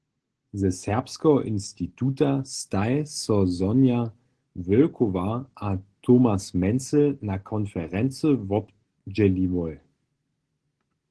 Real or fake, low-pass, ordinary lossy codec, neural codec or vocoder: real; 10.8 kHz; Opus, 16 kbps; none